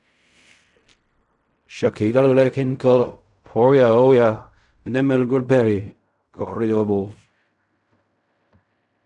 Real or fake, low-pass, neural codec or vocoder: fake; 10.8 kHz; codec, 16 kHz in and 24 kHz out, 0.4 kbps, LongCat-Audio-Codec, fine tuned four codebook decoder